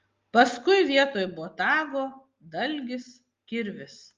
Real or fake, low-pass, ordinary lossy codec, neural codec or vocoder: real; 7.2 kHz; Opus, 32 kbps; none